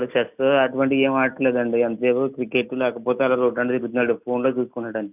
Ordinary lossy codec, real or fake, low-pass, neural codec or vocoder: none; real; 3.6 kHz; none